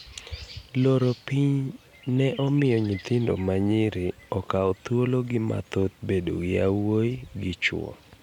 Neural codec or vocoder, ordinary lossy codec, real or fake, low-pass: none; none; real; 19.8 kHz